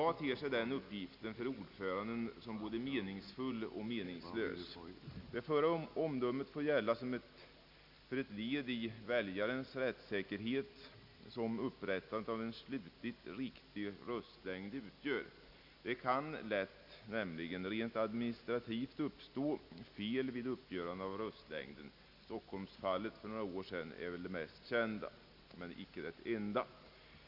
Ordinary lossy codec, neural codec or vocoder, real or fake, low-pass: none; none; real; 5.4 kHz